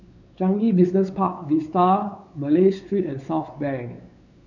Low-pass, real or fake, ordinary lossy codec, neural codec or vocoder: 7.2 kHz; fake; none; codec, 16 kHz, 4 kbps, X-Codec, WavLM features, trained on Multilingual LibriSpeech